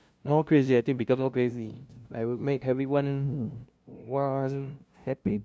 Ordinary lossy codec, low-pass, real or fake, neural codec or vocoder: none; none; fake; codec, 16 kHz, 0.5 kbps, FunCodec, trained on LibriTTS, 25 frames a second